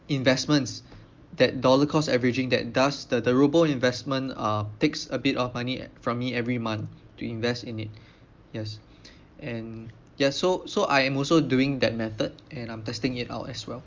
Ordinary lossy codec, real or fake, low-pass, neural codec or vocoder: Opus, 32 kbps; real; 7.2 kHz; none